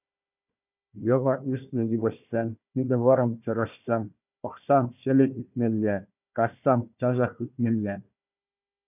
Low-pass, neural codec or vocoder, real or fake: 3.6 kHz; codec, 16 kHz, 1 kbps, FunCodec, trained on Chinese and English, 50 frames a second; fake